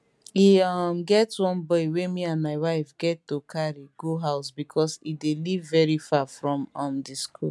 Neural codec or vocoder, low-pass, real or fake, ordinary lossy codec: none; none; real; none